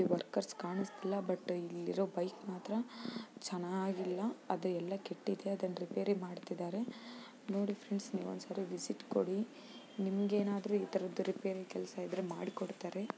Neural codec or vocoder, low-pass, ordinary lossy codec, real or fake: none; none; none; real